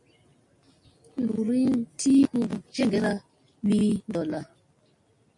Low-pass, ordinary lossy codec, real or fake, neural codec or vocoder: 10.8 kHz; MP3, 48 kbps; real; none